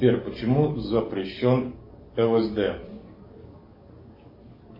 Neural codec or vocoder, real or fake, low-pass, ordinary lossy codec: codec, 44.1 kHz, 7.8 kbps, DAC; fake; 5.4 kHz; MP3, 24 kbps